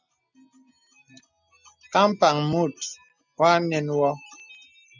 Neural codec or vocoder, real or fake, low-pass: none; real; 7.2 kHz